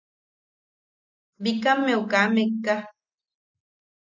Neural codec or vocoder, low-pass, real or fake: none; 7.2 kHz; real